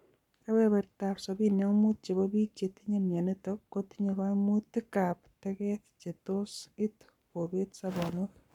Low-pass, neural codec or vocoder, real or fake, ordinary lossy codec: 19.8 kHz; codec, 44.1 kHz, 7.8 kbps, Pupu-Codec; fake; none